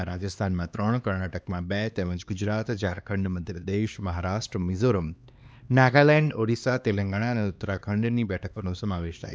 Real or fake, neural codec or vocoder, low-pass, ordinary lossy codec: fake; codec, 16 kHz, 2 kbps, X-Codec, HuBERT features, trained on LibriSpeech; none; none